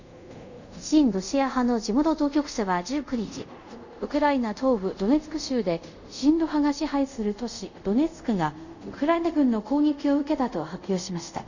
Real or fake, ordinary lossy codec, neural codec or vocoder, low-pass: fake; none; codec, 24 kHz, 0.5 kbps, DualCodec; 7.2 kHz